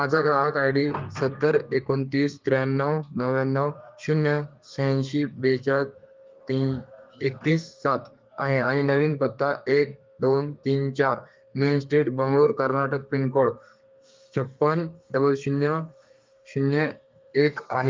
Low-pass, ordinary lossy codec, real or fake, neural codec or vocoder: 7.2 kHz; Opus, 24 kbps; fake; codec, 32 kHz, 1.9 kbps, SNAC